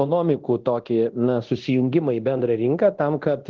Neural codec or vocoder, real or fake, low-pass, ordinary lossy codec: codec, 24 kHz, 0.9 kbps, DualCodec; fake; 7.2 kHz; Opus, 16 kbps